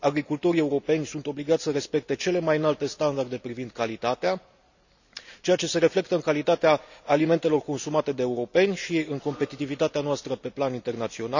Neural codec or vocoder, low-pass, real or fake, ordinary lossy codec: none; 7.2 kHz; real; none